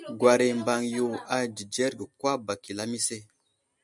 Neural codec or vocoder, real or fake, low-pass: none; real; 10.8 kHz